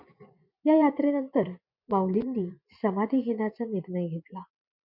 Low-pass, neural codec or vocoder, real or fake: 5.4 kHz; vocoder, 24 kHz, 100 mel bands, Vocos; fake